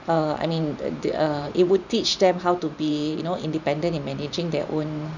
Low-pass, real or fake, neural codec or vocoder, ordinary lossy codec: 7.2 kHz; fake; vocoder, 44.1 kHz, 128 mel bands every 512 samples, BigVGAN v2; none